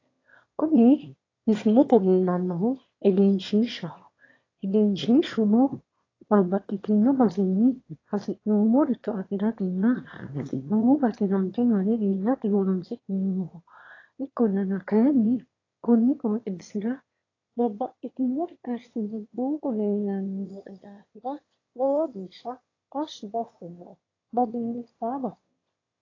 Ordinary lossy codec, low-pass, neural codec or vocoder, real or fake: AAC, 32 kbps; 7.2 kHz; autoencoder, 22.05 kHz, a latent of 192 numbers a frame, VITS, trained on one speaker; fake